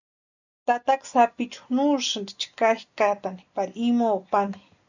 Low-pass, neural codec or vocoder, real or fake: 7.2 kHz; none; real